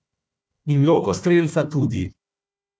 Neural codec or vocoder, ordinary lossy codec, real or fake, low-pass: codec, 16 kHz, 1 kbps, FunCodec, trained on Chinese and English, 50 frames a second; none; fake; none